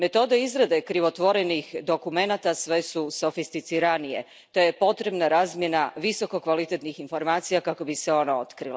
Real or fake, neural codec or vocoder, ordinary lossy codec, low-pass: real; none; none; none